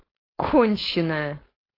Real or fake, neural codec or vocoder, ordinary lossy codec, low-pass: fake; codec, 16 kHz, 4.8 kbps, FACodec; AAC, 24 kbps; 5.4 kHz